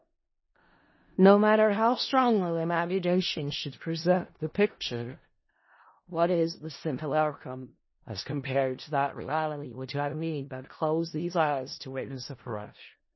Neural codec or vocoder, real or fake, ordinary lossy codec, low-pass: codec, 16 kHz in and 24 kHz out, 0.4 kbps, LongCat-Audio-Codec, four codebook decoder; fake; MP3, 24 kbps; 7.2 kHz